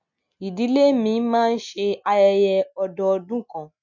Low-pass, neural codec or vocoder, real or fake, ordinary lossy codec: 7.2 kHz; none; real; none